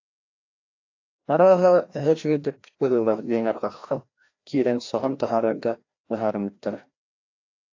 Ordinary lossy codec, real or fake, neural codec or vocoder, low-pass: AAC, 48 kbps; fake; codec, 16 kHz, 1 kbps, FreqCodec, larger model; 7.2 kHz